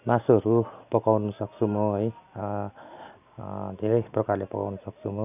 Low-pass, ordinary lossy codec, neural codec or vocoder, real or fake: 3.6 kHz; none; none; real